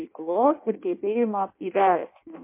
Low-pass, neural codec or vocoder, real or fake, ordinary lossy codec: 3.6 kHz; codec, 16 kHz in and 24 kHz out, 0.6 kbps, FireRedTTS-2 codec; fake; MP3, 24 kbps